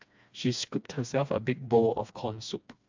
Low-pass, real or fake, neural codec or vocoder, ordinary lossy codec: 7.2 kHz; fake; codec, 16 kHz, 2 kbps, FreqCodec, smaller model; MP3, 64 kbps